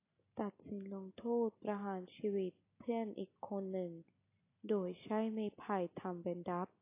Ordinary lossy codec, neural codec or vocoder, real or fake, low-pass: AAC, 24 kbps; none; real; 3.6 kHz